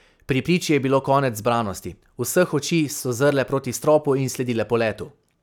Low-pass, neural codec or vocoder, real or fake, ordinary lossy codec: 19.8 kHz; none; real; none